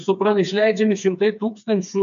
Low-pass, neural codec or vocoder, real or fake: 7.2 kHz; codec, 16 kHz, 4 kbps, FreqCodec, smaller model; fake